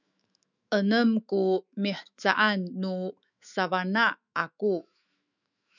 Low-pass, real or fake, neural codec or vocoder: 7.2 kHz; fake; autoencoder, 48 kHz, 128 numbers a frame, DAC-VAE, trained on Japanese speech